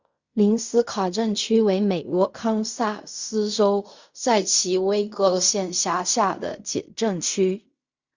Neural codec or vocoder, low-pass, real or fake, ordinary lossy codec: codec, 16 kHz in and 24 kHz out, 0.4 kbps, LongCat-Audio-Codec, fine tuned four codebook decoder; 7.2 kHz; fake; Opus, 64 kbps